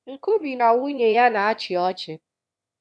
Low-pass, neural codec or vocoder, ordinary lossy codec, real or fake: none; autoencoder, 22.05 kHz, a latent of 192 numbers a frame, VITS, trained on one speaker; none; fake